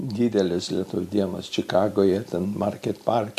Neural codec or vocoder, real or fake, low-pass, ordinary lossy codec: none; real; 14.4 kHz; MP3, 64 kbps